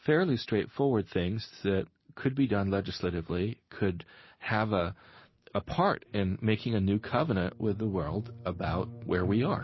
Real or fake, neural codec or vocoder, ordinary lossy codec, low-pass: real; none; MP3, 24 kbps; 7.2 kHz